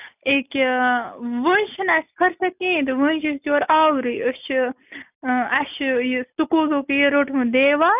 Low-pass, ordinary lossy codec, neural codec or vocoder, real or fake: 3.6 kHz; none; none; real